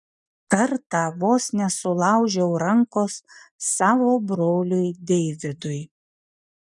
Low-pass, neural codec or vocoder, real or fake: 10.8 kHz; none; real